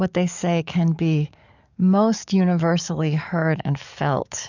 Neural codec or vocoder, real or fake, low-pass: codec, 44.1 kHz, 7.8 kbps, DAC; fake; 7.2 kHz